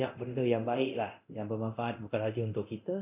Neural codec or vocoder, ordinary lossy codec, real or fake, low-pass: codec, 24 kHz, 0.9 kbps, DualCodec; none; fake; 3.6 kHz